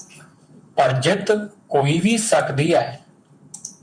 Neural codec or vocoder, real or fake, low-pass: vocoder, 44.1 kHz, 128 mel bands, Pupu-Vocoder; fake; 9.9 kHz